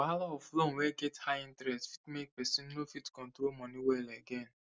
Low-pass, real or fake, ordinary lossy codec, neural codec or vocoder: none; real; none; none